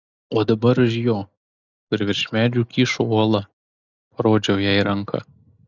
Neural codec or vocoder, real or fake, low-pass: none; real; 7.2 kHz